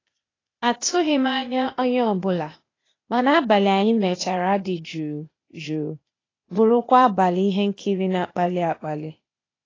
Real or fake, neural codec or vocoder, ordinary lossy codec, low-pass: fake; codec, 16 kHz, 0.8 kbps, ZipCodec; AAC, 32 kbps; 7.2 kHz